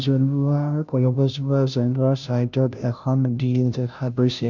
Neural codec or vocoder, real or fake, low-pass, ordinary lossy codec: codec, 16 kHz, 0.5 kbps, FunCodec, trained on Chinese and English, 25 frames a second; fake; 7.2 kHz; none